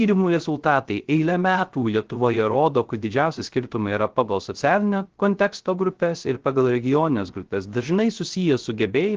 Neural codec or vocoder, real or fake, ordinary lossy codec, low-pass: codec, 16 kHz, 0.3 kbps, FocalCodec; fake; Opus, 16 kbps; 7.2 kHz